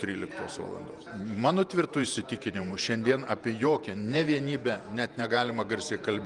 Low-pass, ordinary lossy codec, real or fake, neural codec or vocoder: 10.8 kHz; Opus, 32 kbps; fake; vocoder, 48 kHz, 128 mel bands, Vocos